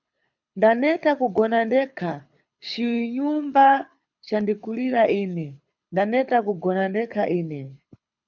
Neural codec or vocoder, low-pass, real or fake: codec, 24 kHz, 6 kbps, HILCodec; 7.2 kHz; fake